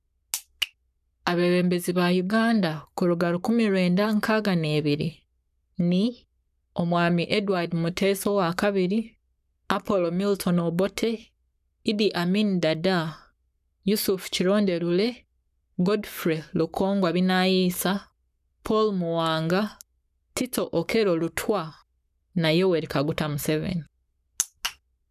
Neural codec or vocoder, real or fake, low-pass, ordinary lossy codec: codec, 44.1 kHz, 7.8 kbps, Pupu-Codec; fake; 14.4 kHz; none